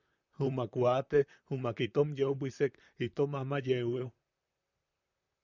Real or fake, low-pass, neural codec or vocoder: fake; 7.2 kHz; vocoder, 44.1 kHz, 128 mel bands, Pupu-Vocoder